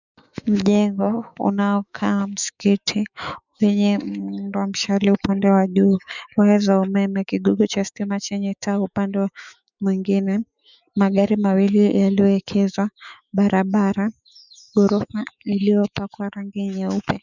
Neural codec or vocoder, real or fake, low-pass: autoencoder, 48 kHz, 128 numbers a frame, DAC-VAE, trained on Japanese speech; fake; 7.2 kHz